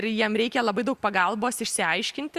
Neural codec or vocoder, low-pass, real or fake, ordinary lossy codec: none; 14.4 kHz; real; Opus, 64 kbps